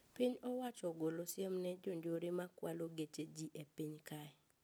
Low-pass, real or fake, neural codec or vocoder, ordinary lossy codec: none; real; none; none